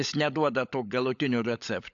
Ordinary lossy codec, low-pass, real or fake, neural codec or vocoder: AAC, 64 kbps; 7.2 kHz; fake; codec, 16 kHz, 16 kbps, FunCodec, trained on LibriTTS, 50 frames a second